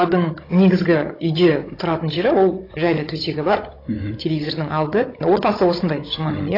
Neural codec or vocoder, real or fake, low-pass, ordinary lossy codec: codec, 16 kHz, 16 kbps, FunCodec, trained on Chinese and English, 50 frames a second; fake; 5.4 kHz; AAC, 24 kbps